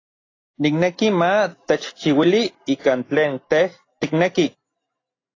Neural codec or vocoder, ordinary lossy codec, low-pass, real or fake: none; AAC, 32 kbps; 7.2 kHz; real